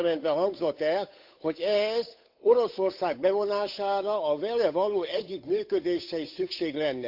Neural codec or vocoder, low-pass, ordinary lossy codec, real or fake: codec, 16 kHz, 8 kbps, FunCodec, trained on Chinese and English, 25 frames a second; 5.4 kHz; AAC, 48 kbps; fake